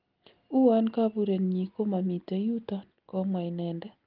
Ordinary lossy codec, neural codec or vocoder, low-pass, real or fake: Opus, 32 kbps; none; 5.4 kHz; real